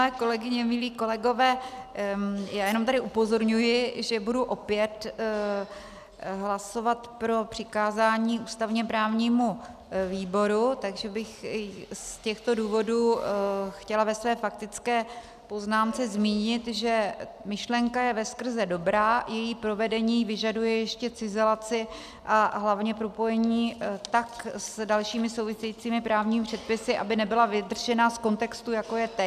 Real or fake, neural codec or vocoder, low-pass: real; none; 14.4 kHz